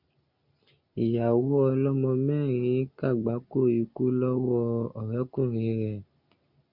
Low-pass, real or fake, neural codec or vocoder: 5.4 kHz; real; none